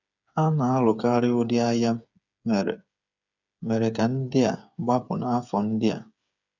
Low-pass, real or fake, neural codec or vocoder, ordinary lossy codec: 7.2 kHz; fake; codec, 16 kHz, 8 kbps, FreqCodec, smaller model; none